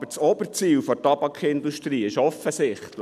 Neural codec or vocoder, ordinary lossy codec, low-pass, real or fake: none; none; 14.4 kHz; real